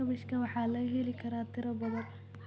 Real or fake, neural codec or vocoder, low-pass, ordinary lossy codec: real; none; none; none